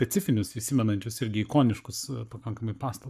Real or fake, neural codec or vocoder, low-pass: fake; codec, 44.1 kHz, 7.8 kbps, Pupu-Codec; 14.4 kHz